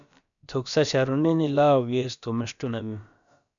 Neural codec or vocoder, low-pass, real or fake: codec, 16 kHz, about 1 kbps, DyCAST, with the encoder's durations; 7.2 kHz; fake